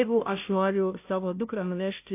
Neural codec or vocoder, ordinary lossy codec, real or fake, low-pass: codec, 16 kHz, 0.5 kbps, FunCodec, trained on Chinese and English, 25 frames a second; AAC, 32 kbps; fake; 3.6 kHz